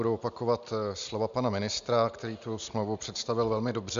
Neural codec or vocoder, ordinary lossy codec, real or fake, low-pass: none; Opus, 64 kbps; real; 7.2 kHz